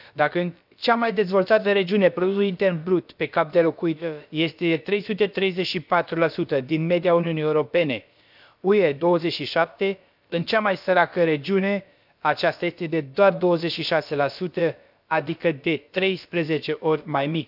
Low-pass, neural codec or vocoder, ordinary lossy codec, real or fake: 5.4 kHz; codec, 16 kHz, about 1 kbps, DyCAST, with the encoder's durations; none; fake